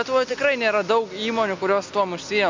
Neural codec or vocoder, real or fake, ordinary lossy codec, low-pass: none; real; MP3, 64 kbps; 7.2 kHz